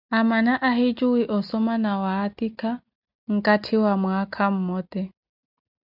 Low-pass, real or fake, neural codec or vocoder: 5.4 kHz; real; none